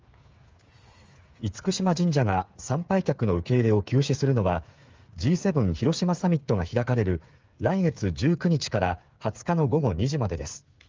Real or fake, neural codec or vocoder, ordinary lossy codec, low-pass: fake; codec, 16 kHz, 8 kbps, FreqCodec, smaller model; Opus, 32 kbps; 7.2 kHz